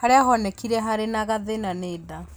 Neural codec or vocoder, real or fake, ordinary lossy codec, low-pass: none; real; none; none